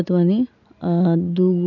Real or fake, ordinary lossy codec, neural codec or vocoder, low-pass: real; none; none; 7.2 kHz